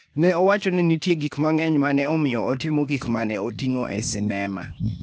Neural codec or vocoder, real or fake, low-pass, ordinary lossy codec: codec, 16 kHz, 0.8 kbps, ZipCodec; fake; none; none